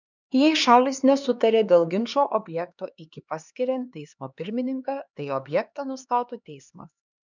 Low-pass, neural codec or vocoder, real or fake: 7.2 kHz; codec, 16 kHz, 2 kbps, X-Codec, HuBERT features, trained on LibriSpeech; fake